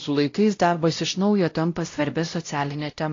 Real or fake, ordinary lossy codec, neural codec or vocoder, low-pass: fake; AAC, 32 kbps; codec, 16 kHz, 0.5 kbps, X-Codec, WavLM features, trained on Multilingual LibriSpeech; 7.2 kHz